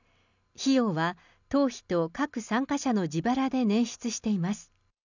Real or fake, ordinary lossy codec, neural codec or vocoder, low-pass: real; none; none; 7.2 kHz